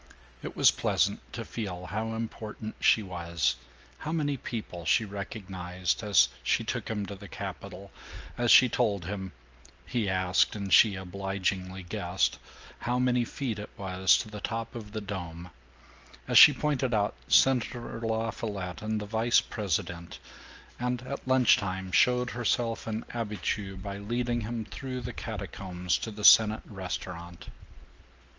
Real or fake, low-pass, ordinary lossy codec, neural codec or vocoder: real; 7.2 kHz; Opus, 24 kbps; none